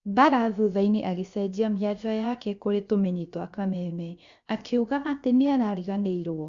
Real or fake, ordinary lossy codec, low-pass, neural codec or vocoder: fake; Opus, 64 kbps; 7.2 kHz; codec, 16 kHz, about 1 kbps, DyCAST, with the encoder's durations